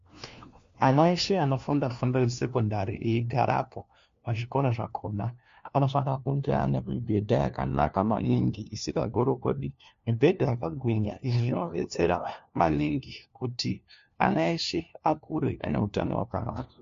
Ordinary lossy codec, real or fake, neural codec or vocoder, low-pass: MP3, 48 kbps; fake; codec, 16 kHz, 1 kbps, FunCodec, trained on LibriTTS, 50 frames a second; 7.2 kHz